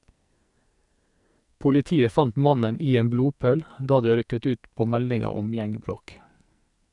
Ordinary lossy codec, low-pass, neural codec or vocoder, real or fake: none; 10.8 kHz; codec, 44.1 kHz, 2.6 kbps, SNAC; fake